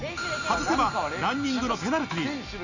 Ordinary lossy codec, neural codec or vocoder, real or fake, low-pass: none; none; real; 7.2 kHz